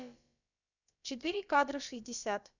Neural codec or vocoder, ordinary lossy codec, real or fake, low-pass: codec, 16 kHz, about 1 kbps, DyCAST, with the encoder's durations; none; fake; 7.2 kHz